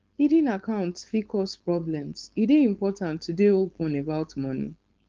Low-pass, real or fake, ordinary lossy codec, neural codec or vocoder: 7.2 kHz; fake; Opus, 24 kbps; codec, 16 kHz, 4.8 kbps, FACodec